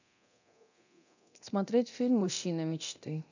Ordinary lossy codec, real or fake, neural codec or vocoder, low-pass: none; fake; codec, 24 kHz, 0.9 kbps, DualCodec; 7.2 kHz